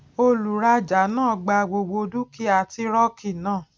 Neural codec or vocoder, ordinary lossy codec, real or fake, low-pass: none; none; real; none